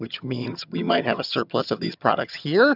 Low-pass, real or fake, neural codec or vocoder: 5.4 kHz; fake; vocoder, 22.05 kHz, 80 mel bands, HiFi-GAN